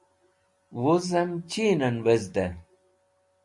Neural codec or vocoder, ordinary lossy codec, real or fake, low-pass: none; MP3, 64 kbps; real; 10.8 kHz